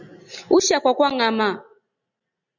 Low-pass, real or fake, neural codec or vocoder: 7.2 kHz; real; none